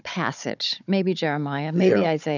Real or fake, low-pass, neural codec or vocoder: fake; 7.2 kHz; codec, 16 kHz, 16 kbps, FunCodec, trained on Chinese and English, 50 frames a second